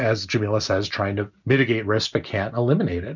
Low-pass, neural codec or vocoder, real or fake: 7.2 kHz; none; real